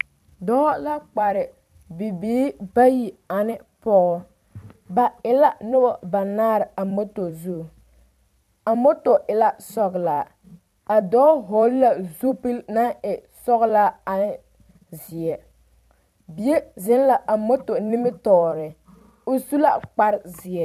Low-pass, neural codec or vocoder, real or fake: 14.4 kHz; vocoder, 44.1 kHz, 128 mel bands every 256 samples, BigVGAN v2; fake